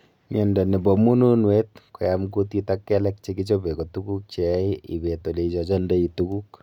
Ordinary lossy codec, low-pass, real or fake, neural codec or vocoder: none; 19.8 kHz; real; none